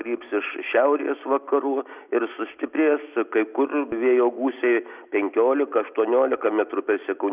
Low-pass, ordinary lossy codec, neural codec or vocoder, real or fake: 3.6 kHz; Opus, 64 kbps; none; real